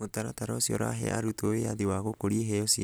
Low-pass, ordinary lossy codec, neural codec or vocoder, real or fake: none; none; none; real